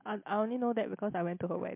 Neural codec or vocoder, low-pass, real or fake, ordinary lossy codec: none; 3.6 kHz; real; MP3, 32 kbps